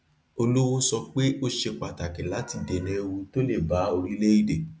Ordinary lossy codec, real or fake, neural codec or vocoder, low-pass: none; real; none; none